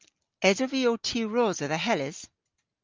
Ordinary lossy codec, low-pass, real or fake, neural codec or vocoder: Opus, 24 kbps; 7.2 kHz; real; none